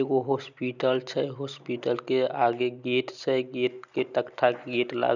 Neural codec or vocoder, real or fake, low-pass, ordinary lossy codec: none; real; 7.2 kHz; none